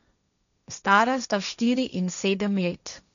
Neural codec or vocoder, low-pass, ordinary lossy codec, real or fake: codec, 16 kHz, 1.1 kbps, Voila-Tokenizer; 7.2 kHz; none; fake